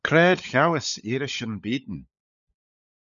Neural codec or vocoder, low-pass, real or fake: codec, 16 kHz, 16 kbps, FunCodec, trained on LibriTTS, 50 frames a second; 7.2 kHz; fake